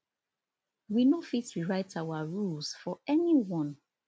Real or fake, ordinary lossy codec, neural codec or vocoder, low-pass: real; none; none; none